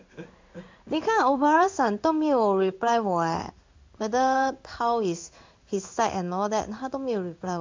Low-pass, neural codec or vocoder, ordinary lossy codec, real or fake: 7.2 kHz; codec, 16 kHz in and 24 kHz out, 1 kbps, XY-Tokenizer; MP3, 64 kbps; fake